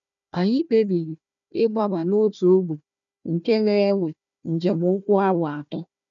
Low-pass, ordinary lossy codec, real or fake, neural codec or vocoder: 7.2 kHz; none; fake; codec, 16 kHz, 1 kbps, FunCodec, trained on Chinese and English, 50 frames a second